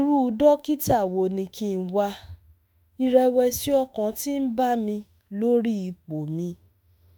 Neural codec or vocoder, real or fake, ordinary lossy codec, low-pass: autoencoder, 48 kHz, 32 numbers a frame, DAC-VAE, trained on Japanese speech; fake; none; none